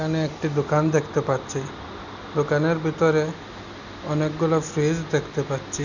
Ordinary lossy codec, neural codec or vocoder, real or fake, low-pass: none; none; real; 7.2 kHz